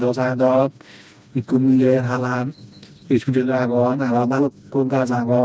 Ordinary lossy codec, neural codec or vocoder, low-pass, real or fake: none; codec, 16 kHz, 1 kbps, FreqCodec, smaller model; none; fake